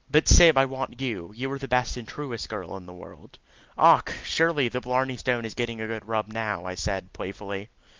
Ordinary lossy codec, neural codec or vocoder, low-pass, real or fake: Opus, 24 kbps; none; 7.2 kHz; real